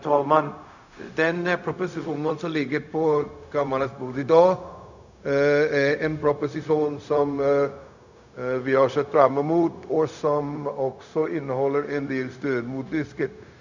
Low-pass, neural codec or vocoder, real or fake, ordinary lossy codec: 7.2 kHz; codec, 16 kHz, 0.4 kbps, LongCat-Audio-Codec; fake; none